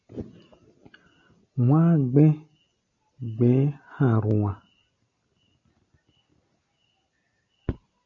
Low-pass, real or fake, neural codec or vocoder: 7.2 kHz; real; none